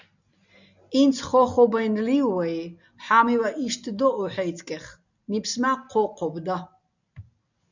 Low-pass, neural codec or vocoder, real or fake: 7.2 kHz; none; real